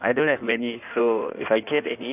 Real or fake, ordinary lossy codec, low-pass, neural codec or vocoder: fake; none; 3.6 kHz; codec, 16 kHz in and 24 kHz out, 1.1 kbps, FireRedTTS-2 codec